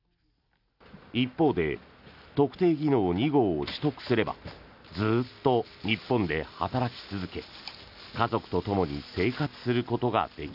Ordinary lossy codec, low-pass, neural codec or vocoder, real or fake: none; 5.4 kHz; none; real